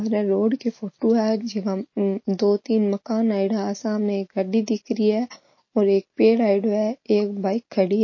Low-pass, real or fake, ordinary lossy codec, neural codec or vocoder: 7.2 kHz; real; MP3, 32 kbps; none